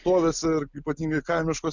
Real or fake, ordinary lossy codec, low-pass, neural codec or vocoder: real; MP3, 64 kbps; 7.2 kHz; none